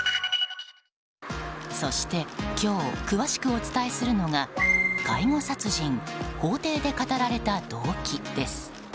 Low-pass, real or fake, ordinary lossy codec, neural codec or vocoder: none; real; none; none